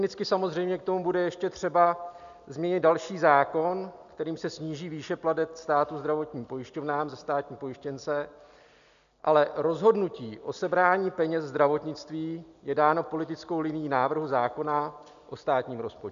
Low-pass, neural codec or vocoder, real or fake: 7.2 kHz; none; real